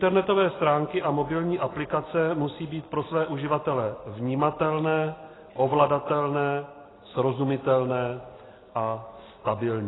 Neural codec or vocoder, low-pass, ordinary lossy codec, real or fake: none; 7.2 kHz; AAC, 16 kbps; real